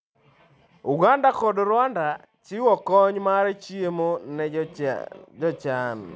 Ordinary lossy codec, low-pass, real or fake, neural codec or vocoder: none; none; real; none